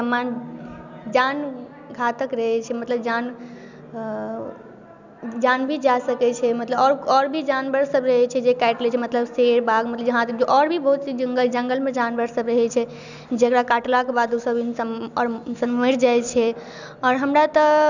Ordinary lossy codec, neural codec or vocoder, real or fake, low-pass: none; none; real; 7.2 kHz